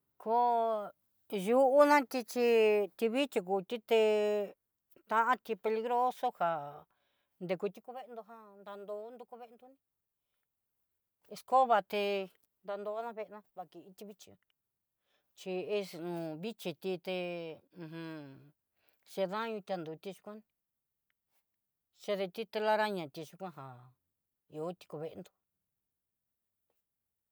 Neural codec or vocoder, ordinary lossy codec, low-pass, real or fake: none; none; none; real